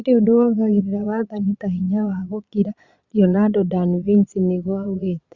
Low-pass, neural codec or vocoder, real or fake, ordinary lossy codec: 7.2 kHz; vocoder, 22.05 kHz, 80 mel bands, Vocos; fake; Opus, 64 kbps